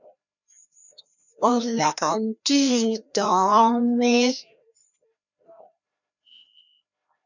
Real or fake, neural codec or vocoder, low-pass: fake; codec, 16 kHz, 1 kbps, FreqCodec, larger model; 7.2 kHz